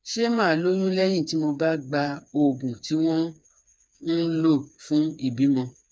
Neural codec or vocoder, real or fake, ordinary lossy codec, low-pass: codec, 16 kHz, 4 kbps, FreqCodec, smaller model; fake; none; none